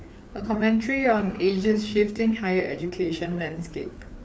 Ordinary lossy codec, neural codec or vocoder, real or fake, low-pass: none; codec, 16 kHz, 4 kbps, FreqCodec, larger model; fake; none